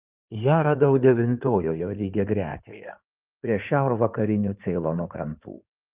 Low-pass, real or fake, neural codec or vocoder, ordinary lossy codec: 3.6 kHz; fake; codec, 16 kHz in and 24 kHz out, 2.2 kbps, FireRedTTS-2 codec; Opus, 24 kbps